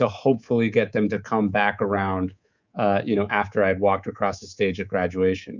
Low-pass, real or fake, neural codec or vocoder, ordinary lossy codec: 7.2 kHz; fake; codec, 24 kHz, 3.1 kbps, DualCodec; Opus, 64 kbps